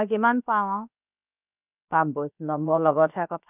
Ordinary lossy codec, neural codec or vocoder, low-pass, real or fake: none; codec, 16 kHz, about 1 kbps, DyCAST, with the encoder's durations; 3.6 kHz; fake